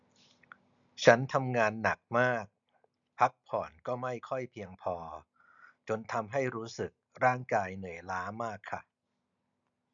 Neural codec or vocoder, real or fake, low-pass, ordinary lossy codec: none; real; 7.2 kHz; none